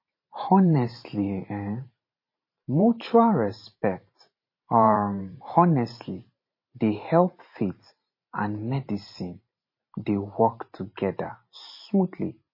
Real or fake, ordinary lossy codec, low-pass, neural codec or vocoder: fake; MP3, 24 kbps; 5.4 kHz; vocoder, 44.1 kHz, 128 mel bands every 512 samples, BigVGAN v2